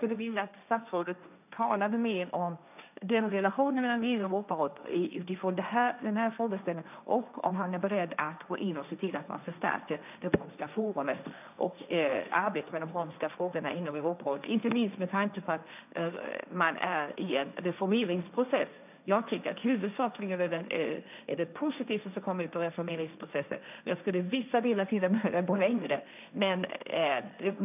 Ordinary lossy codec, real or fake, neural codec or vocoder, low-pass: none; fake; codec, 16 kHz, 1.1 kbps, Voila-Tokenizer; 3.6 kHz